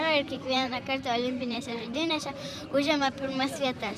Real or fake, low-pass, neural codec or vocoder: fake; 14.4 kHz; vocoder, 44.1 kHz, 128 mel bands, Pupu-Vocoder